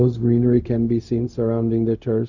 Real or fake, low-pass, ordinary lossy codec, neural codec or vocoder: fake; 7.2 kHz; none; codec, 16 kHz, 0.4 kbps, LongCat-Audio-Codec